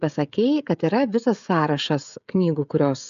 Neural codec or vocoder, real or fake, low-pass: codec, 16 kHz, 16 kbps, FreqCodec, smaller model; fake; 7.2 kHz